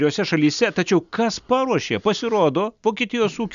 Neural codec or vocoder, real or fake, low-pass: none; real; 7.2 kHz